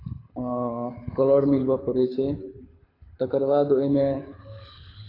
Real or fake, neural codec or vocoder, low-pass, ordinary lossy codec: fake; codec, 24 kHz, 6 kbps, HILCodec; 5.4 kHz; AAC, 24 kbps